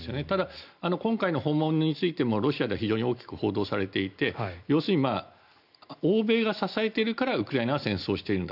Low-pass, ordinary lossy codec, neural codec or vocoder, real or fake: 5.4 kHz; none; none; real